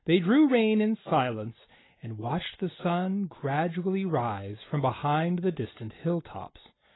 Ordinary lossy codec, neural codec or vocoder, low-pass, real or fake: AAC, 16 kbps; none; 7.2 kHz; real